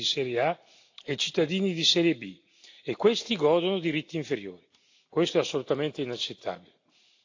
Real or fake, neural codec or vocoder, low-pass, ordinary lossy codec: real; none; 7.2 kHz; AAC, 48 kbps